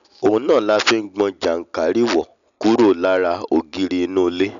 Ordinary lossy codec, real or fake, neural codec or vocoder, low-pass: none; real; none; 7.2 kHz